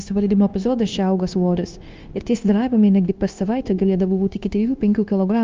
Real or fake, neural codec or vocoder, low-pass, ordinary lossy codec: fake; codec, 16 kHz, 0.9 kbps, LongCat-Audio-Codec; 7.2 kHz; Opus, 32 kbps